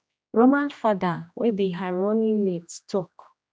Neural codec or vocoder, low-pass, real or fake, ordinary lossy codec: codec, 16 kHz, 1 kbps, X-Codec, HuBERT features, trained on general audio; none; fake; none